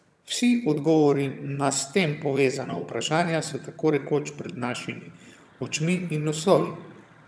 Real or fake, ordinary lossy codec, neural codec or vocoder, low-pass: fake; none; vocoder, 22.05 kHz, 80 mel bands, HiFi-GAN; none